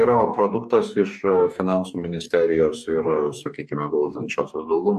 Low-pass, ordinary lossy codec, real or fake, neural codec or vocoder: 14.4 kHz; AAC, 96 kbps; fake; codec, 44.1 kHz, 2.6 kbps, DAC